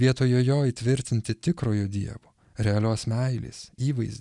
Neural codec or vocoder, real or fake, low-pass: none; real; 10.8 kHz